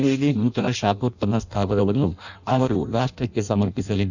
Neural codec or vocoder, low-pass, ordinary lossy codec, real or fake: codec, 16 kHz in and 24 kHz out, 0.6 kbps, FireRedTTS-2 codec; 7.2 kHz; none; fake